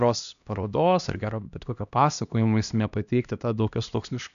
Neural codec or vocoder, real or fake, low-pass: codec, 16 kHz, 1 kbps, X-Codec, HuBERT features, trained on LibriSpeech; fake; 7.2 kHz